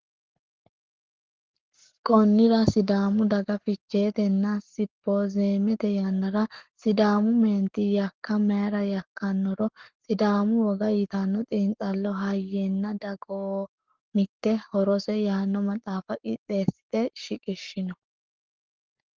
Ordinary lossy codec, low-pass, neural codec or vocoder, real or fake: Opus, 16 kbps; 7.2 kHz; codec, 44.1 kHz, 7.8 kbps, Pupu-Codec; fake